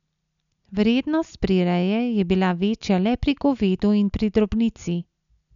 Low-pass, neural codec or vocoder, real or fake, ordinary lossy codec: 7.2 kHz; none; real; none